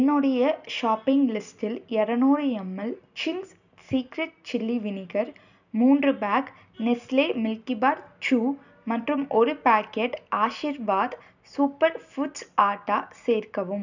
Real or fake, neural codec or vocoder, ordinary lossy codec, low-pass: real; none; none; 7.2 kHz